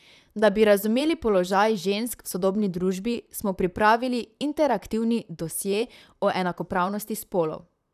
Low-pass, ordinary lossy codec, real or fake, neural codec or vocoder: 14.4 kHz; none; fake; vocoder, 44.1 kHz, 128 mel bands, Pupu-Vocoder